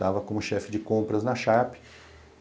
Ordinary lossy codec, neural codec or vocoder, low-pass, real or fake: none; none; none; real